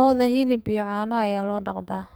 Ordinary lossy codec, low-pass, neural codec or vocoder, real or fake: none; none; codec, 44.1 kHz, 2.6 kbps, SNAC; fake